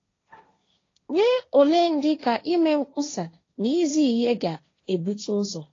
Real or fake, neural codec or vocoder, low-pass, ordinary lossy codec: fake; codec, 16 kHz, 1.1 kbps, Voila-Tokenizer; 7.2 kHz; AAC, 32 kbps